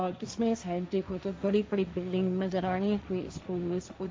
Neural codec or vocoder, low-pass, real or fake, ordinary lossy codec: codec, 16 kHz, 1.1 kbps, Voila-Tokenizer; none; fake; none